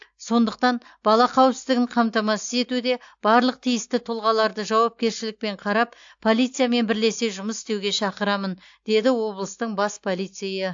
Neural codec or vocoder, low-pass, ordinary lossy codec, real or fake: none; 7.2 kHz; AAC, 64 kbps; real